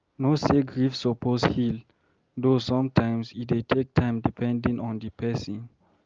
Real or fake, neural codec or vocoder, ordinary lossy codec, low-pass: real; none; Opus, 24 kbps; 7.2 kHz